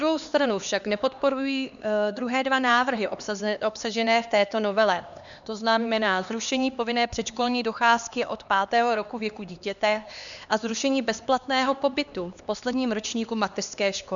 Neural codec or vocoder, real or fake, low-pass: codec, 16 kHz, 2 kbps, X-Codec, HuBERT features, trained on LibriSpeech; fake; 7.2 kHz